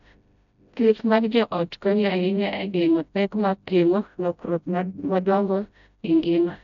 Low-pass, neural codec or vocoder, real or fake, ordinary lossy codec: 7.2 kHz; codec, 16 kHz, 0.5 kbps, FreqCodec, smaller model; fake; none